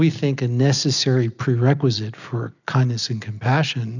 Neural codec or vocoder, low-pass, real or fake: none; 7.2 kHz; real